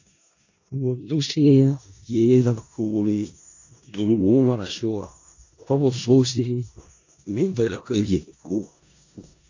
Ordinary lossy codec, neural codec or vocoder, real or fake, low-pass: AAC, 48 kbps; codec, 16 kHz in and 24 kHz out, 0.4 kbps, LongCat-Audio-Codec, four codebook decoder; fake; 7.2 kHz